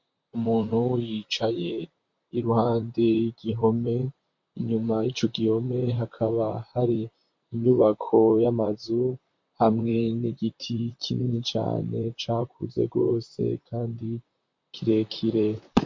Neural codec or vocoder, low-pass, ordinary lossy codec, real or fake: vocoder, 44.1 kHz, 128 mel bands, Pupu-Vocoder; 7.2 kHz; MP3, 48 kbps; fake